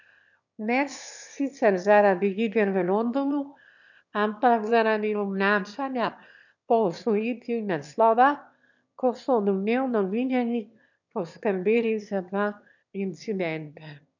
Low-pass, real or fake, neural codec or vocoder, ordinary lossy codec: 7.2 kHz; fake; autoencoder, 22.05 kHz, a latent of 192 numbers a frame, VITS, trained on one speaker; none